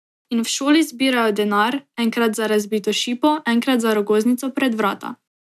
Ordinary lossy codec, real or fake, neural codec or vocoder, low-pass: none; real; none; 14.4 kHz